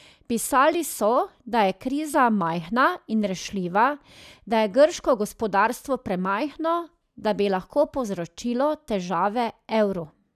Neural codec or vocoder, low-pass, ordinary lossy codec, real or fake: none; 14.4 kHz; none; real